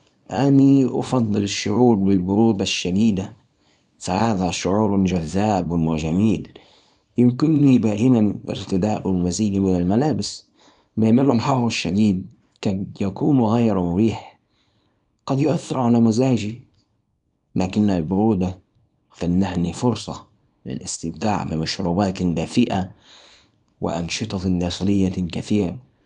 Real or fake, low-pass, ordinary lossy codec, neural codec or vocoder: fake; 10.8 kHz; none; codec, 24 kHz, 0.9 kbps, WavTokenizer, small release